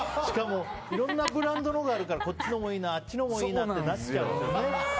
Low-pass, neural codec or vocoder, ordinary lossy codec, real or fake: none; none; none; real